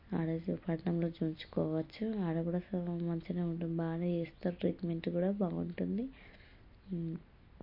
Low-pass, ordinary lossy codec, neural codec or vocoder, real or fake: 5.4 kHz; MP3, 32 kbps; none; real